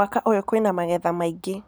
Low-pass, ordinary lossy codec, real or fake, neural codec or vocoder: none; none; real; none